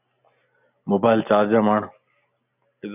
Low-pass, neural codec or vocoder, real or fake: 3.6 kHz; none; real